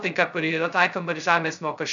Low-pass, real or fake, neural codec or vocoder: 7.2 kHz; fake; codec, 16 kHz, 0.2 kbps, FocalCodec